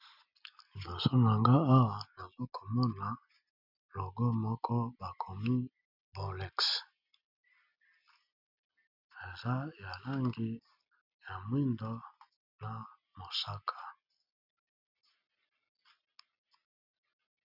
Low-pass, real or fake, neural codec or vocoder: 5.4 kHz; real; none